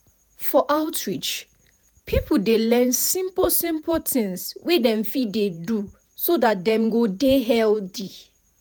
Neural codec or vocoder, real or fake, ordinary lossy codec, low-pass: vocoder, 48 kHz, 128 mel bands, Vocos; fake; none; none